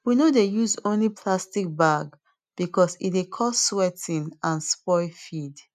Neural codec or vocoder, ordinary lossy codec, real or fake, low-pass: none; none; real; 14.4 kHz